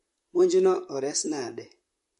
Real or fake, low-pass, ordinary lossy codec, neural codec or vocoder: fake; 14.4 kHz; MP3, 48 kbps; vocoder, 44.1 kHz, 128 mel bands, Pupu-Vocoder